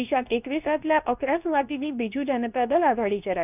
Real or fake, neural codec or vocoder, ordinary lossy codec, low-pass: fake; codec, 24 kHz, 0.9 kbps, WavTokenizer, medium speech release version 2; none; 3.6 kHz